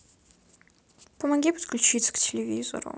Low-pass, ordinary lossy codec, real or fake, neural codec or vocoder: none; none; real; none